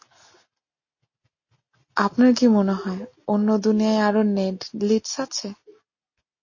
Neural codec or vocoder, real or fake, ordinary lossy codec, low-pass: none; real; MP3, 32 kbps; 7.2 kHz